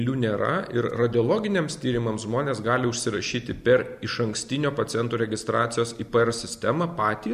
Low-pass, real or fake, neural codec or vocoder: 14.4 kHz; real; none